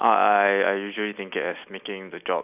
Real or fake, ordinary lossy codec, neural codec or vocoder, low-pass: real; none; none; 3.6 kHz